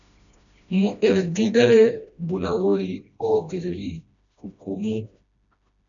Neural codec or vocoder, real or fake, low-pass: codec, 16 kHz, 1 kbps, FreqCodec, smaller model; fake; 7.2 kHz